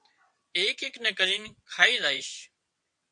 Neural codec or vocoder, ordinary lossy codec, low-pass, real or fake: vocoder, 22.05 kHz, 80 mel bands, WaveNeXt; MP3, 64 kbps; 9.9 kHz; fake